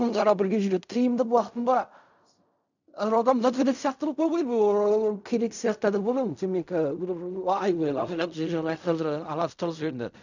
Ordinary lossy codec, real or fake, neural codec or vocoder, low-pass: none; fake; codec, 16 kHz in and 24 kHz out, 0.4 kbps, LongCat-Audio-Codec, fine tuned four codebook decoder; 7.2 kHz